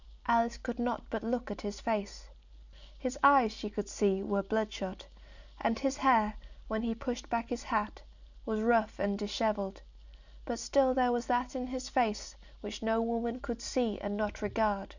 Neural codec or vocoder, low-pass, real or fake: none; 7.2 kHz; real